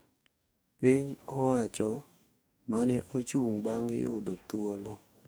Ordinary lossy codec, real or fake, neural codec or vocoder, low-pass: none; fake; codec, 44.1 kHz, 2.6 kbps, DAC; none